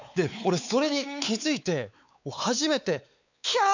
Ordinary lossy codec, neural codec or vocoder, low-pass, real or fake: none; codec, 16 kHz, 4 kbps, X-Codec, WavLM features, trained on Multilingual LibriSpeech; 7.2 kHz; fake